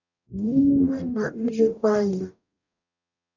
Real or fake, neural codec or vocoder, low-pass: fake; codec, 44.1 kHz, 0.9 kbps, DAC; 7.2 kHz